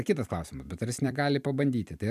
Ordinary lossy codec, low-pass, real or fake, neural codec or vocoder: MP3, 96 kbps; 14.4 kHz; fake; vocoder, 44.1 kHz, 128 mel bands every 256 samples, BigVGAN v2